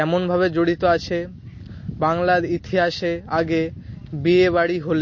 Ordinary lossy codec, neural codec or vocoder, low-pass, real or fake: MP3, 32 kbps; none; 7.2 kHz; real